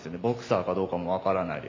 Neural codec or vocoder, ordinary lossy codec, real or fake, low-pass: none; AAC, 32 kbps; real; 7.2 kHz